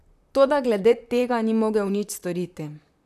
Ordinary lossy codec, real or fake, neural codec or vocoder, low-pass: none; fake; vocoder, 44.1 kHz, 128 mel bands, Pupu-Vocoder; 14.4 kHz